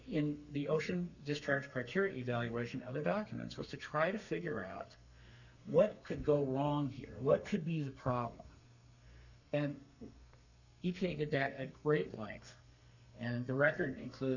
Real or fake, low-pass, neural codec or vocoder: fake; 7.2 kHz; codec, 32 kHz, 1.9 kbps, SNAC